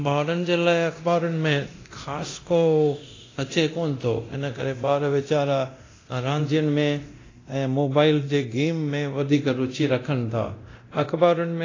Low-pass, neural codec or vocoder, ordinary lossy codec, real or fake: 7.2 kHz; codec, 24 kHz, 0.9 kbps, DualCodec; AAC, 32 kbps; fake